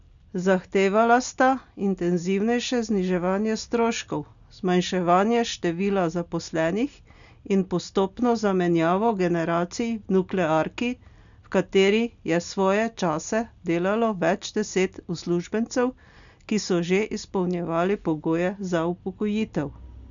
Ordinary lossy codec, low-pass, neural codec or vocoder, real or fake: none; 7.2 kHz; none; real